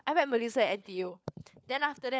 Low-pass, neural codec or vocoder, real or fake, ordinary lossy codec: none; codec, 16 kHz, 4 kbps, FunCodec, trained on LibriTTS, 50 frames a second; fake; none